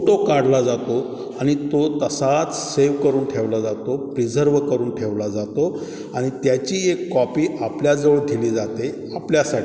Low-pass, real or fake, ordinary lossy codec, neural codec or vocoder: none; real; none; none